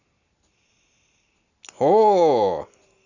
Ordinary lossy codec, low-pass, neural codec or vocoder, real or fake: none; 7.2 kHz; none; real